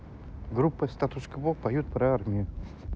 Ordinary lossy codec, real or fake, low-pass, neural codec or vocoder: none; real; none; none